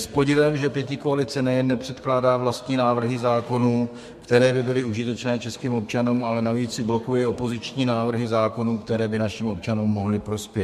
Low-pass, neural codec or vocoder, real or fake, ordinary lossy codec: 14.4 kHz; codec, 32 kHz, 1.9 kbps, SNAC; fake; MP3, 64 kbps